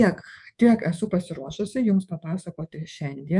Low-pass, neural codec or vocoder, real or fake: 10.8 kHz; codec, 24 kHz, 3.1 kbps, DualCodec; fake